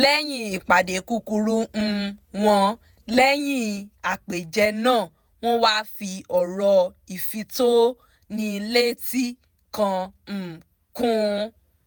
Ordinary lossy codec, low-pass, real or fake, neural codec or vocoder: none; none; fake; vocoder, 48 kHz, 128 mel bands, Vocos